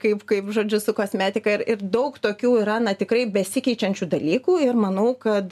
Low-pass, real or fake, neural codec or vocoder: 14.4 kHz; real; none